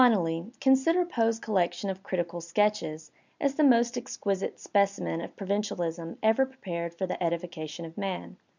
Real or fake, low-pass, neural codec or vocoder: real; 7.2 kHz; none